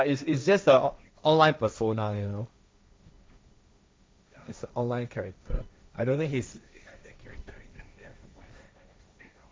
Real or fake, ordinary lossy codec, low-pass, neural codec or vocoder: fake; none; none; codec, 16 kHz, 1.1 kbps, Voila-Tokenizer